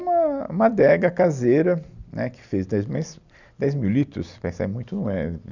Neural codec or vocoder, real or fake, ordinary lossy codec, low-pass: none; real; none; 7.2 kHz